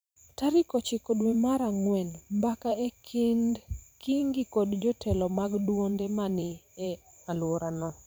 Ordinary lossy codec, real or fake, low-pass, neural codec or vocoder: none; fake; none; vocoder, 44.1 kHz, 128 mel bands every 512 samples, BigVGAN v2